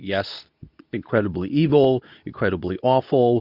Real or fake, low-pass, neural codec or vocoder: fake; 5.4 kHz; codec, 24 kHz, 0.9 kbps, WavTokenizer, medium speech release version 2